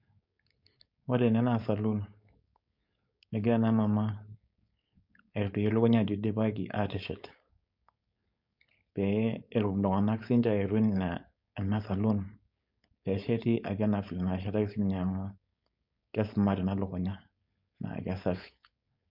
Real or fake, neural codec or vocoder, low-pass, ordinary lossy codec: fake; codec, 16 kHz, 4.8 kbps, FACodec; 5.4 kHz; MP3, 48 kbps